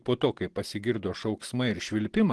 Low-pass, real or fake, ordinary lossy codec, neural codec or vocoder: 10.8 kHz; real; Opus, 16 kbps; none